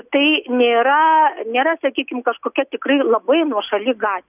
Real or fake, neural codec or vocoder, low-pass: real; none; 3.6 kHz